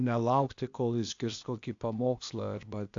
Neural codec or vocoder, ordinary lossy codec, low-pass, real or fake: codec, 16 kHz, 0.8 kbps, ZipCodec; AAC, 64 kbps; 7.2 kHz; fake